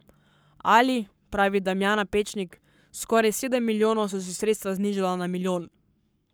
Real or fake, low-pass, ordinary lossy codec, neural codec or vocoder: fake; none; none; codec, 44.1 kHz, 7.8 kbps, Pupu-Codec